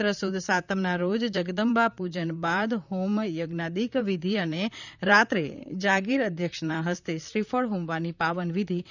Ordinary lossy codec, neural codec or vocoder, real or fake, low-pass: none; vocoder, 44.1 kHz, 128 mel bands, Pupu-Vocoder; fake; 7.2 kHz